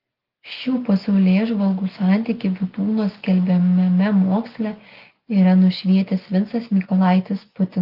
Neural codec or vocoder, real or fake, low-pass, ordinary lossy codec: none; real; 5.4 kHz; Opus, 32 kbps